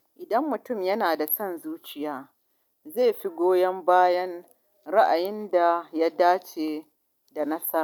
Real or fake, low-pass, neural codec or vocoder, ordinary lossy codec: real; 19.8 kHz; none; none